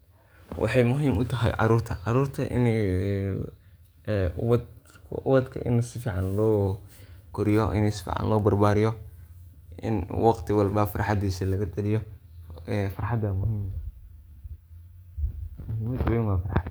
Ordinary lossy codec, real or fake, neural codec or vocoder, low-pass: none; fake; codec, 44.1 kHz, 7.8 kbps, DAC; none